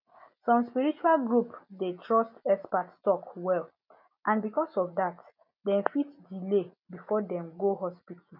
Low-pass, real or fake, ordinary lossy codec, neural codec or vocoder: 5.4 kHz; real; none; none